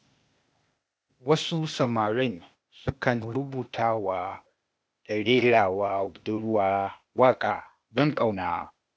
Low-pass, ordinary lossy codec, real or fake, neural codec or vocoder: none; none; fake; codec, 16 kHz, 0.8 kbps, ZipCodec